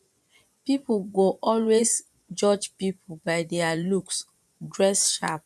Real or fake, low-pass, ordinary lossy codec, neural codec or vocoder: fake; none; none; vocoder, 24 kHz, 100 mel bands, Vocos